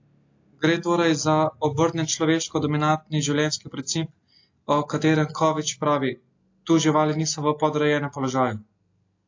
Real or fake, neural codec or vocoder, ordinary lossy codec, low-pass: real; none; AAC, 48 kbps; 7.2 kHz